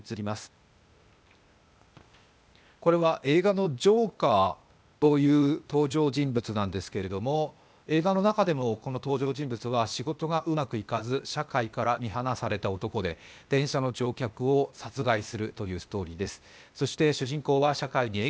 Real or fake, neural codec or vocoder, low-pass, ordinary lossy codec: fake; codec, 16 kHz, 0.8 kbps, ZipCodec; none; none